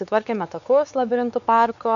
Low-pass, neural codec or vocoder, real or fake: 7.2 kHz; codec, 16 kHz, 4 kbps, X-Codec, WavLM features, trained on Multilingual LibriSpeech; fake